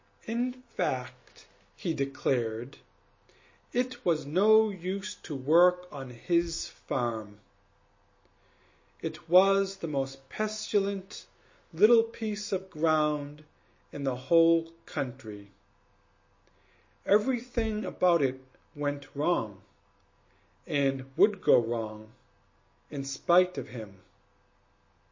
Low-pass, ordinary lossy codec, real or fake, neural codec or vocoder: 7.2 kHz; MP3, 32 kbps; real; none